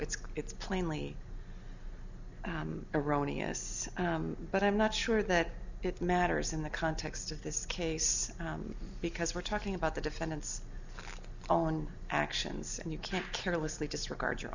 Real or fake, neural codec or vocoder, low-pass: real; none; 7.2 kHz